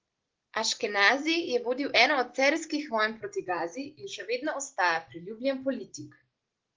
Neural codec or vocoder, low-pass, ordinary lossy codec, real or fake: none; 7.2 kHz; Opus, 16 kbps; real